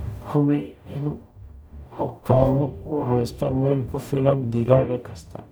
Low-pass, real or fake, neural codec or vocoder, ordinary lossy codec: none; fake; codec, 44.1 kHz, 0.9 kbps, DAC; none